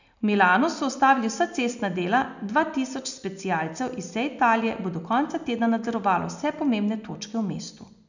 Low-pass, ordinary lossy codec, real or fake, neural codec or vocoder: 7.2 kHz; none; real; none